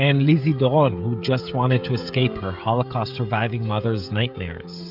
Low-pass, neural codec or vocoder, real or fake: 5.4 kHz; codec, 16 kHz, 16 kbps, FreqCodec, smaller model; fake